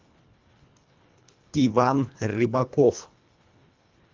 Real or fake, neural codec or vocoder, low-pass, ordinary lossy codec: fake; codec, 24 kHz, 1.5 kbps, HILCodec; 7.2 kHz; Opus, 32 kbps